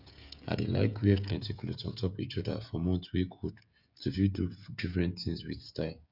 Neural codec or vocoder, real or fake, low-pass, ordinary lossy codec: codec, 16 kHz, 16 kbps, FreqCodec, smaller model; fake; 5.4 kHz; none